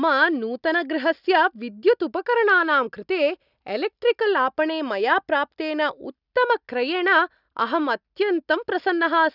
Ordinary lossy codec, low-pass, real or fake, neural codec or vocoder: none; 5.4 kHz; real; none